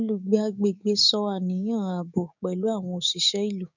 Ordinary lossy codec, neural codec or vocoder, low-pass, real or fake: none; codec, 16 kHz, 6 kbps, DAC; 7.2 kHz; fake